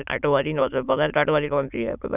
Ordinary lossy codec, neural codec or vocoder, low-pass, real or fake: none; autoencoder, 22.05 kHz, a latent of 192 numbers a frame, VITS, trained on many speakers; 3.6 kHz; fake